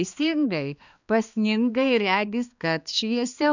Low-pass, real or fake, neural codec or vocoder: 7.2 kHz; fake; codec, 16 kHz, 2 kbps, X-Codec, HuBERT features, trained on balanced general audio